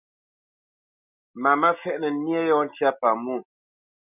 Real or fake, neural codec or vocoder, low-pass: real; none; 3.6 kHz